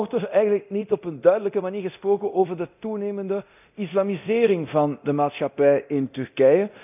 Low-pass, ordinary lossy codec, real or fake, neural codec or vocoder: 3.6 kHz; none; fake; codec, 24 kHz, 0.9 kbps, DualCodec